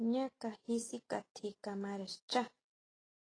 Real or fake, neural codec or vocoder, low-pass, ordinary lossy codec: real; none; 9.9 kHz; AAC, 32 kbps